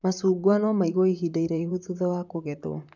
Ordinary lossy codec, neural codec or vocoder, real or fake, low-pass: none; vocoder, 44.1 kHz, 128 mel bands, Pupu-Vocoder; fake; 7.2 kHz